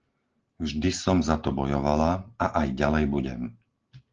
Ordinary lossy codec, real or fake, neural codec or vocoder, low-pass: Opus, 16 kbps; real; none; 7.2 kHz